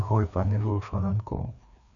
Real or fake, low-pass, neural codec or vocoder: fake; 7.2 kHz; codec, 16 kHz, 2 kbps, FreqCodec, larger model